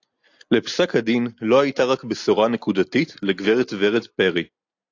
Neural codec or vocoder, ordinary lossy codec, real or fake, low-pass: none; AAC, 48 kbps; real; 7.2 kHz